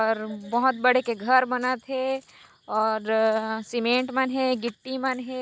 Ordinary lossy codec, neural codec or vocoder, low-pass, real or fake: none; none; none; real